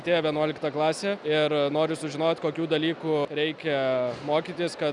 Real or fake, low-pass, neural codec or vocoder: real; 10.8 kHz; none